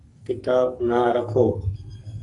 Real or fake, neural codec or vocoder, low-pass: fake; codec, 44.1 kHz, 2.6 kbps, SNAC; 10.8 kHz